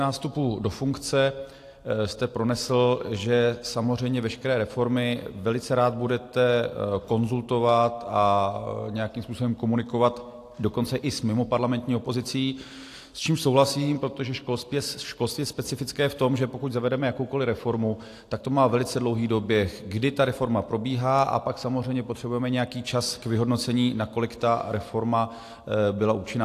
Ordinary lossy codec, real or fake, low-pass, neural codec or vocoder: AAC, 64 kbps; real; 14.4 kHz; none